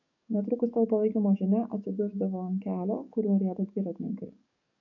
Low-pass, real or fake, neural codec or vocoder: 7.2 kHz; fake; codec, 44.1 kHz, 7.8 kbps, DAC